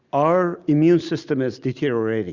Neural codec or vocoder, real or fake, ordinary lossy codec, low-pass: none; real; Opus, 64 kbps; 7.2 kHz